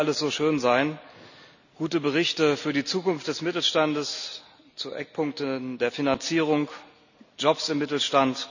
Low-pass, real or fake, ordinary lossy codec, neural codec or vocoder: 7.2 kHz; real; none; none